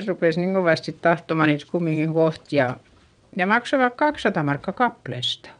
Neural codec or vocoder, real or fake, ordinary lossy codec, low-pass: vocoder, 22.05 kHz, 80 mel bands, WaveNeXt; fake; none; 9.9 kHz